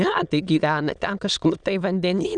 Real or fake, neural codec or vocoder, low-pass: fake; autoencoder, 22.05 kHz, a latent of 192 numbers a frame, VITS, trained on many speakers; 9.9 kHz